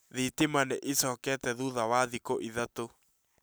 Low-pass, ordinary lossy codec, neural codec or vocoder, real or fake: none; none; none; real